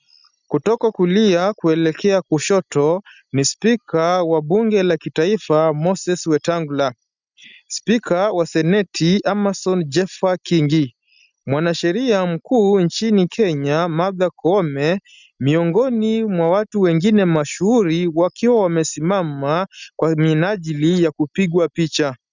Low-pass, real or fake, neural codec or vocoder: 7.2 kHz; real; none